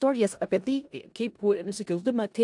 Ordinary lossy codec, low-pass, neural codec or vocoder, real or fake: AAC, 64 kbps; 10.8 kHz; codec, 16 kHz in and 24 kHz out, 0.4 kbps, LongCat-Audio-Codec, four codebook decoder; fake